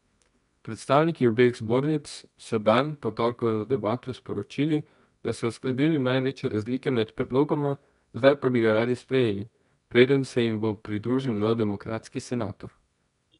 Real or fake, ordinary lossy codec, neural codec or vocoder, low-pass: fake; none; codec, 24 kHz, 0.9 kbps, WavTokenizer, medium music audio release; 10.8 kHz